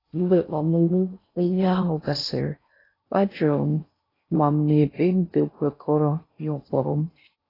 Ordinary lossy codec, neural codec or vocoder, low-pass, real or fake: AAC, 24 kbps; codec, 16 kHz in and 24 kHz out, 0.6 kbps, FocalCodec, streaming, 4096 codes; 5.4 kHz; fake